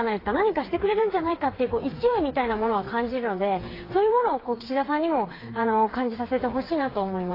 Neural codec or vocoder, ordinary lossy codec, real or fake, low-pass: codec, 16 kHz, 4 kbps, FreqCodec, smaller model; AAC, 24 kbps; fake; 5.4 kHz